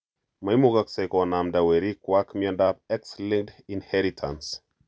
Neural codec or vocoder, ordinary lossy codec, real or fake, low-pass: none; none; real; none